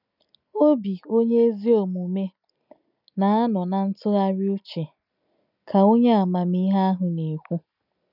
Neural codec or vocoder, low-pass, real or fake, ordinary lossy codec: none; 5.4 kHz; real; none